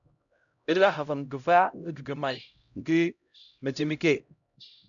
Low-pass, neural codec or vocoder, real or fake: 7.2 kHz; codec, 16 kHz, 0.5 kbps, X-Codec, HuBERT features, trained on LibriSpeech; fake